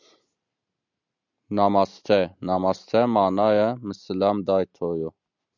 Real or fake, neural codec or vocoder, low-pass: real; none; 7.2 kHz